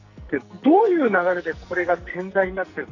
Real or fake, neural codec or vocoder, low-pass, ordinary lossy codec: fake; codec, 44.1 kHz, 2.6 kbps, SNAC; 7.2 kHz; none